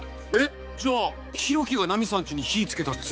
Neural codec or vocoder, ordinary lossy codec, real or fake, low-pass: codec, 16 kHz, 4 kbps, X-Codec, HuBERT features, trained on balanced general audio; none; fake; none